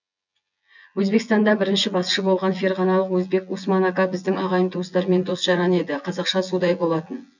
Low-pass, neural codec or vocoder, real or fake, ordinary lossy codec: 7.2 kHz; vocoder, 24 kHz, 100 mel bands, Vocos; fake; none